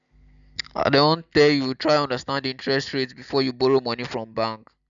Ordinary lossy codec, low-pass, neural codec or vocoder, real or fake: MP3, 96 kbps; 7.2 kHz; none; real